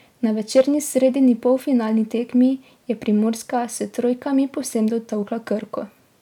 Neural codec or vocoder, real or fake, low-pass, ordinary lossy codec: none; real; 19.8 kHz; none